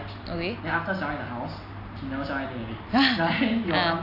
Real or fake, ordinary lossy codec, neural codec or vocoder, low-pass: real; AAC, 32 kbps; none; 5.4 kHz